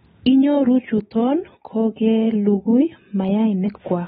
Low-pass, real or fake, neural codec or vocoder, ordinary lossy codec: 19.8 kHz; real; none; AAC, 16 kbps